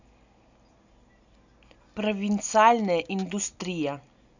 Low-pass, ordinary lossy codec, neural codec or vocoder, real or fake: 7.2 kHz; none; none; real